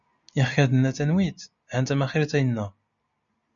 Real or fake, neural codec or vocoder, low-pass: real; none; 7.2 kHz